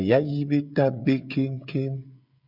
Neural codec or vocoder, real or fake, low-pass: codec, 16 kHz, 16 kbps, FreqCodec, smaller model; fake; 5.4 kHz